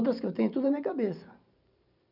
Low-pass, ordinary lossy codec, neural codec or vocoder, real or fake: 5.4 kHz; none; vocoder, 44.1 kHz, 128 mel bands every 512 samples, BigVGAN v2; fake